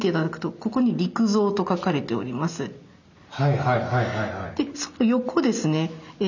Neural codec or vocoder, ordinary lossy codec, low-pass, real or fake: none; none; 7.2 kHz; real